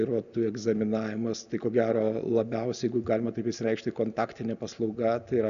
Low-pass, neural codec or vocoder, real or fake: 7.2 kHz; none; real